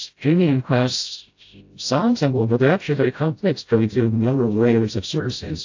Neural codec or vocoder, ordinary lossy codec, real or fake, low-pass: codec, 16 kHz, 0.5 kbps, FreqCodec, smaller model; AAC, 48 kbps; fake; 7.2 kHz